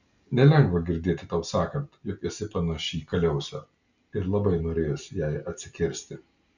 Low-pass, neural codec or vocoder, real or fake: 7.2 kHz; none; real